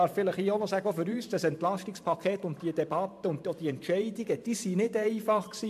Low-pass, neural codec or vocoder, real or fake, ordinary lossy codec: 14.4 kHz; vocoder, 44.1 kHz, 128 mel bands every 512 samples, BigVGAN v2; fake; MP3, 64 kbps